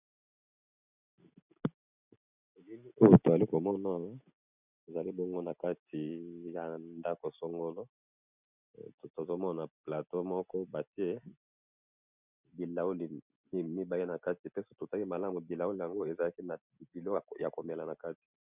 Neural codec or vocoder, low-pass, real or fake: none; 3.6 kHz; real